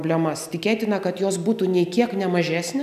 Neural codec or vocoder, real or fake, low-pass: none; real; 14.4 kHz